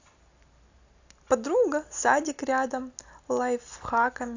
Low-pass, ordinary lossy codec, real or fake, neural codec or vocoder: 7.2 kHz; AAC, 48 kbps; real; none